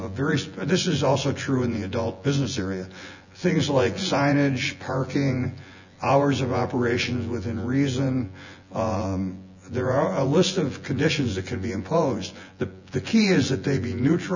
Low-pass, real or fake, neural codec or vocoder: 7.2 kHz; fake; vocoder, 24 kHz, 100 mel bands, Vocos